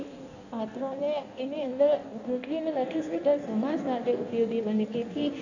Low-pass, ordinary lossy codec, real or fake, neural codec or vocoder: 7.2 kHz; none; fake; codec, 16 kHz in and 24 kHz out, 1.1 kbps, FireRedTTS-2 codec